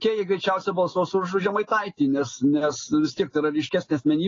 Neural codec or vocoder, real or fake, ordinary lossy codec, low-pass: none; real; AAC, 32 kbps; 7.2 kHz